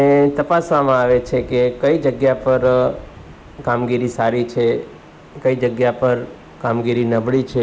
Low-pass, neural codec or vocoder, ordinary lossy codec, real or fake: none; none; none; real